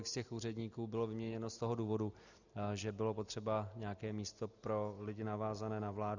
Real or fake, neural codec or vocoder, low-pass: real; none; 7.2 kHz